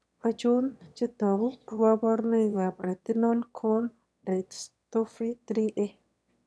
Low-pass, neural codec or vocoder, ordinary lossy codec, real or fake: none; autoencoder, 22.05 kHz, a latent of 192 numbers a frame, VITS, trained on one speaker; none; fake